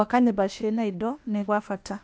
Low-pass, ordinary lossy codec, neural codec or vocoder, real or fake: none; none; codec, 16 kHz, 0.8 kbps, ZipCodec; fake